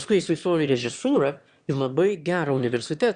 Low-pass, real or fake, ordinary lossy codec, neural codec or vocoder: 9.9 kHz; fake; Opus, 64 kbps; autoencoder, 22.05 kHz, a latent of 192 numbers a frame, VITS, trained on one speaker